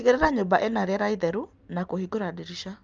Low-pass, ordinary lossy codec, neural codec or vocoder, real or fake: 7.2 kHz; Opus, 32 kbps; none; real